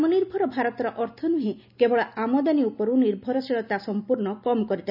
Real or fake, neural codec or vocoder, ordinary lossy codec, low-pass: real; none; none; 5.4 kHz